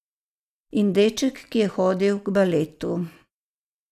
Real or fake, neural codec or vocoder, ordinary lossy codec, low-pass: real; none; none; 14.4 kHz